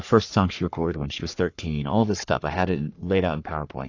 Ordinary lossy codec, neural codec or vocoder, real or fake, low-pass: AAC, 48 kbps; codec, 44.1 kHz, 3.4 kbps, Pupu-Codec; fake; 7.2 kHz